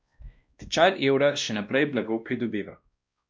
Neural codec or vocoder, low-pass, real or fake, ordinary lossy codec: codec, 16 kHz, 1 kbps, X-Codec, WavLM features, trained on Multilingual LibriSpeech; none; fake; none